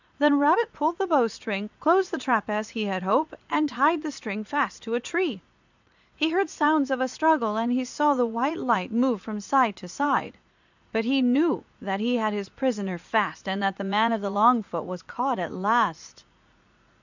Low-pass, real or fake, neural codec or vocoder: 7.2 kHz; fake; vocoder, 44.1 kHz, 80 mel bands, Vocos